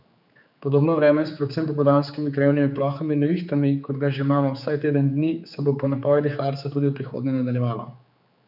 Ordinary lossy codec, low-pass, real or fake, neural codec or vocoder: none; 5.4 kHz; fake; codec, 16 kHz, 4 kbps, X-Codec, HuBERT features, trained on general audio